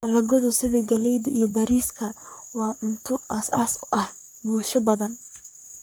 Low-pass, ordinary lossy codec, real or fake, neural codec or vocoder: none; none; fake; codec, 44.1 kHz, 3.4 kbps, Pupu-Codec